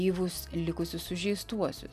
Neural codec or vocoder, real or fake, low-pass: none; real; 14.4 kHz